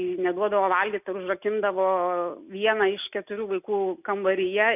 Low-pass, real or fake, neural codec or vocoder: 3.6 kHz; real; none